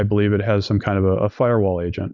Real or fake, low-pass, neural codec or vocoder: real; 7.2 kHz; none